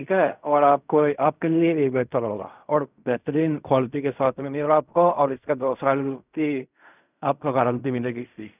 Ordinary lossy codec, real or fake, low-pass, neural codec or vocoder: none; fake; 3.6 kHz; codec, 16 kHz in and 24 kHz out, 0.4 kbps, LongCat-Audio-Codec, fine tuned four codebook decoder